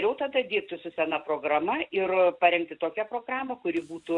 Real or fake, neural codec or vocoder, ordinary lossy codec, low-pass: real; none; MP3, 96 kbps; 10.8 kHz